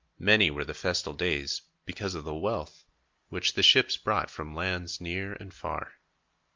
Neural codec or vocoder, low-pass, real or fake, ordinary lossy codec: none; 7.2 kHz; real; Opus, 24 kbps